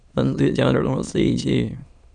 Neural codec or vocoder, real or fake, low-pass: autoencoder, 22.05 kHz, a latent of 192 numbers a frame, VITS, trained on many speakers; fake; 9.9 kHz